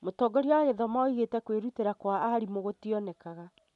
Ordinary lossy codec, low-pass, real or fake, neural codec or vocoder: none; 10.8 kHz; real; none